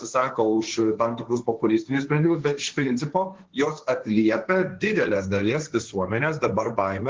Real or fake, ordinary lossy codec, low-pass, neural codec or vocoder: fake; Opus, 16 kbps; 7.2 kHz; codec, 16 kHz, 1.1 kbps, Voila-Tokenizer